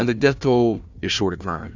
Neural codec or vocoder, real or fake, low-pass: codec, 16 kHz, 1 kbps, FunCodec, trained on Chinese and English, 50 frames a second; fake; 7.2 kHz